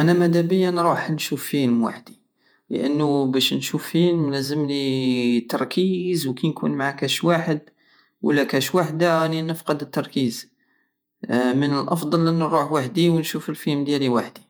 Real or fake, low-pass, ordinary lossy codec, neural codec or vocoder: fake; none; none; vocoder, 48 kHz, 128 mel bands, Vocos